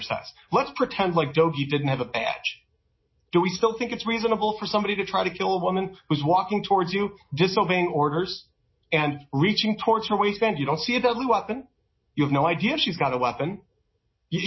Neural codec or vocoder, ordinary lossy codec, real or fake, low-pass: none; MP3, 24 kbps; real; 7.2 kHz